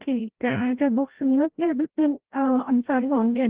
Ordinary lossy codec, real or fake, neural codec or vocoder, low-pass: Opus, 16 kbps; fake; codec, 16 kHz, 0.5 kbps, FreqCodec, larger model; 3.6 kHz